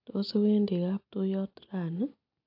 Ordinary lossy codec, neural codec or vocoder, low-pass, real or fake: AAC, 48 kbps; none; 5.4 kHz; real